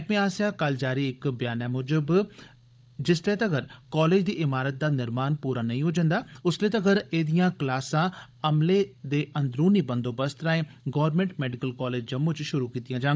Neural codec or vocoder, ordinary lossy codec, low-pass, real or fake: codec, 16 kHz, 16 kbps, FunCodec, trained on Chinese and English, 50 frames a second; none; none; fake